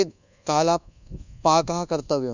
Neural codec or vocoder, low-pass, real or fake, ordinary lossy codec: codec, 24 kHz, 1.2 kbps, DualCodec; 7.2 kHz; fake; none